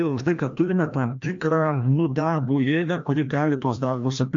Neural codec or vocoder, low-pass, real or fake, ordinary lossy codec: codec, 16 kHz, 1 kbps, FreqCodec, larger model; 7.2 kHz; fake; AAC, 64 kbps